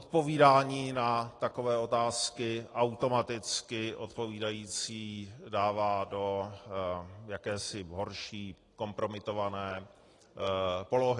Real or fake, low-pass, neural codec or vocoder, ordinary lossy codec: real; 10.8 kHz; none; AAC, 32 kbps